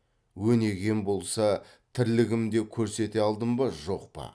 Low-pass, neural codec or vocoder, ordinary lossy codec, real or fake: none; none; none; real